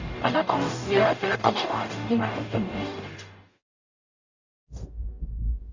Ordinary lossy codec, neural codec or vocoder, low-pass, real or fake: Opus, 64 kbps; codec, 44.1 kHz, 0.9 kbps, DAC; 7.2 kHz; fake